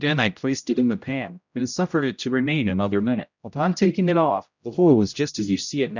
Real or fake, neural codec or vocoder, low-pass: fake; codec, 16 kHz, 0.5 kbps, X-Codec, HuBERT features, trained on general audio; 7.2 kHz